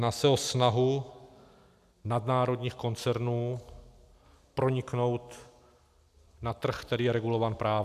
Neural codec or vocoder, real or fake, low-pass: none; real; 14.4 kHz